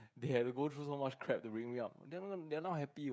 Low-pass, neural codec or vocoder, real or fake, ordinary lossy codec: none; codec, 16 kHz, 16 kbps, FreqCodec, smaller model; fake; none